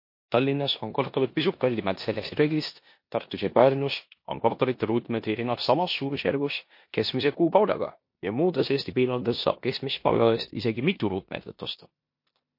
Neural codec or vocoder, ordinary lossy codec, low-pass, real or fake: codec, 16 kHz in and 24 kHz out, 0.9 kbps, LongCat-Audio-Codec, four codebook decoder; MP3, 32 kbps; 5.4 kHz; fake